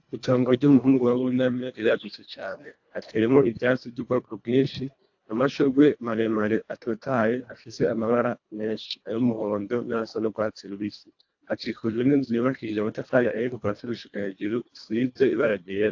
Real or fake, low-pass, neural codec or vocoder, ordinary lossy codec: fake; 7.2 kHz; codec, 24 kHz, 1.5 kbps, HILCodec; AAC, 48 kbps